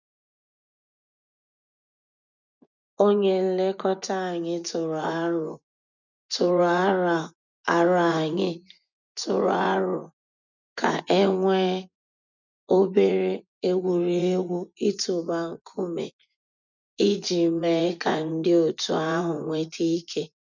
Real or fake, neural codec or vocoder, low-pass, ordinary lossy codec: fake; vocoder, 24 kHz, 100 mel bands, Vocos; 7.2 kHz; none